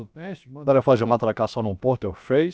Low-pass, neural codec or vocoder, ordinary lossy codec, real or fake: none; codec, 16 kHz, about 1 kbps, DyCAST, with the encoder's durations; none; fake